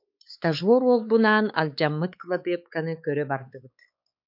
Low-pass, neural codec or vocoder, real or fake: 5.4 kHz; codec, 16 kHz, 4 kbps, X-Codec, WavLM features, trained on Multilingual LibriSpeech; fake